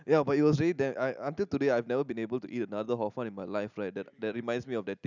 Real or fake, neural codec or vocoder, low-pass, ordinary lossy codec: real; none; 7.2 kHz; none